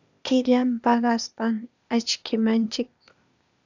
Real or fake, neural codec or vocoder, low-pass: fake; codec, 16 kHz, 0.8 kbps, ZipCodec; 7.2 kHz